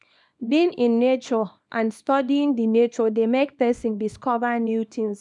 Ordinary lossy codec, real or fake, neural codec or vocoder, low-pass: none; fake; codec, 24 kHz, 0.9 kbps, WavTokenizer, small release; 10.8 kHz